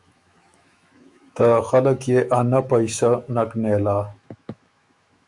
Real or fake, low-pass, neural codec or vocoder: fake; 10.8 kHz; autoencoder, 48 kHz, 128 numbers a frame, DAC-VAE, trained on Japanese speech